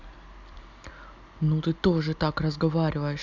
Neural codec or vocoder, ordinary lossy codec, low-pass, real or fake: none; none; 7.2 kHz; real